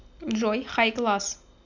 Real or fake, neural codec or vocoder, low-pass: real; none; 7.2 kHz